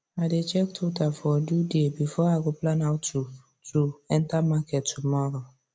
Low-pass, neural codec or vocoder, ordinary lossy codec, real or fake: none; none; none; real